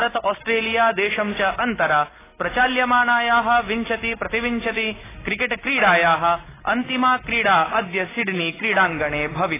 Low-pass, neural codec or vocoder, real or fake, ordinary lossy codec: 3.6 kHz; none; real; AAC, 16 kbps